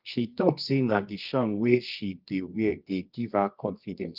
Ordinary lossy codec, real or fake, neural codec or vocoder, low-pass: Opus, 24 kbps; fake; codec, 24 kHz, 0.9 kbps, WavTokenizer, medium music audio release; 5.4 kHz